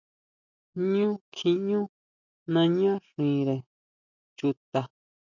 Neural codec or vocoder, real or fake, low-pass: none; real; 7.2 kHz